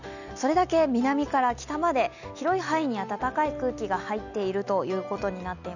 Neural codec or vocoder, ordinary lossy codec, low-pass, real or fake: none; none; 7.2 kHz; real